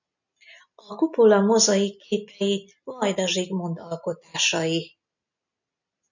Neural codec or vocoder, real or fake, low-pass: none; real; 7.2 kHz